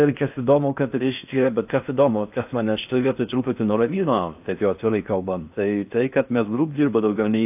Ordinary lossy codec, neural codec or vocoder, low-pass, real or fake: AAC, 32 kbps; codec, 16 kHz in and 24 kHz out, 0.6 kbps, FocalCodec, streaming, 4096 codes; 3.6 kHz; fake